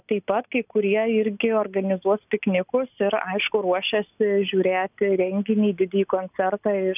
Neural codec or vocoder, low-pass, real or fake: none; 3.6 kHz; real